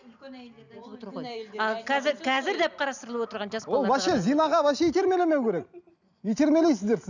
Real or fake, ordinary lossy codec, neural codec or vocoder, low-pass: real; none; none; 7.2 kHz